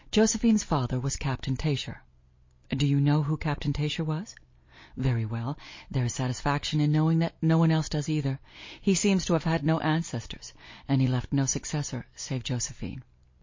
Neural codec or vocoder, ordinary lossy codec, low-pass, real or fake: none; MP3, 32 kbps; 7.2 kHz; real